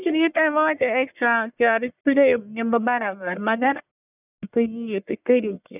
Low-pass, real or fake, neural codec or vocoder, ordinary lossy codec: 3.6 kHz; fake; codec, 44.1 kHz, 1.7 kbps, Pupu-Codec; none